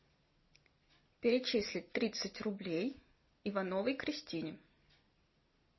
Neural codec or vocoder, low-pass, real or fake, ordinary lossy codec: none; 7.2 kHz; real; MP3, 24 kbps